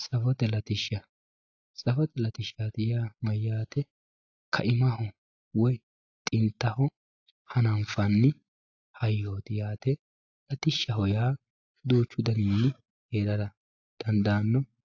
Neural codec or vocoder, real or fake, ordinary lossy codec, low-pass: none; real; AAC, 48 kbps; 7.2 kHz